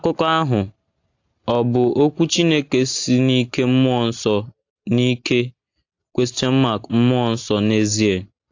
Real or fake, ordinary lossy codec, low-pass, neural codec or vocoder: real; AAC, 48 kbps; 7.2 kHz; none